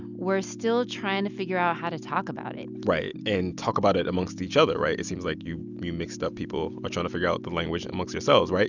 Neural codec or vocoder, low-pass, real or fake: none; 7.2 kHz; real